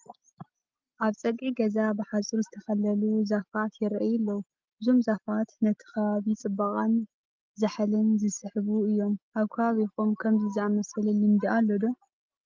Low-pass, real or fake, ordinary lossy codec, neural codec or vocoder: 7.2 kHz; real; Opus, 32 kbps; none